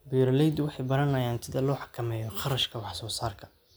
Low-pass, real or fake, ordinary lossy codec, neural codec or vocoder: none; real; none; none